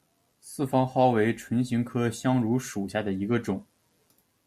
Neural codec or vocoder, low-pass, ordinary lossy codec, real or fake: none; 14.4 kHz; Opus, 64 kbps; real